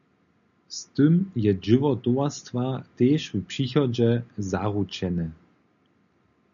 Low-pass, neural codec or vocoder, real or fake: 7.2 kHz; none; real